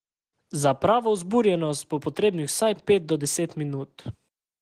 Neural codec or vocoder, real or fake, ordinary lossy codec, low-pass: none; real; Opus, 16 kbps; 14.4 kHz